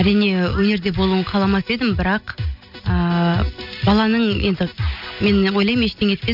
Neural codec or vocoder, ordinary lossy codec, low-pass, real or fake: none; none; 5.4 kHz; real